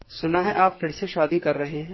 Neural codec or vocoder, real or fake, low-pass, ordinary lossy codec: codec, 44.1 kHz, 2.6 kbps, SNAC; fake; 7.2 kHz; MP3, 24 kbps